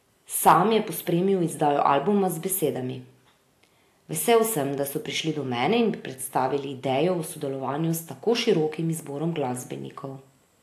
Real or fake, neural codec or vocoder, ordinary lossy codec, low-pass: real; none; AAC, 64 kbps; 14.4 kHz